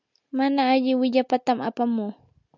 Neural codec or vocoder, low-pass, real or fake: none; 7.2 kHz; real